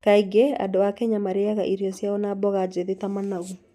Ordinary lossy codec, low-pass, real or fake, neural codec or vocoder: none; 14.4 kHz; real; none